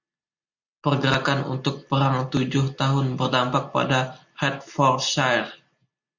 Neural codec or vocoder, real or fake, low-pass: none; real; 7.2 kHz